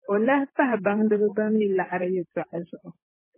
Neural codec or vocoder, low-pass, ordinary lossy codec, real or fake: vocoder, 44.1 kHz, 128 mel bands, Pupu-Vocoder; 3.6 kHz; MP3, 16 kbps; fake